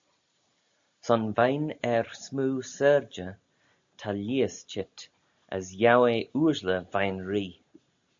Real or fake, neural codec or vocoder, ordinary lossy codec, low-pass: real; none; AAC, 64 kbps; 7.2 kHz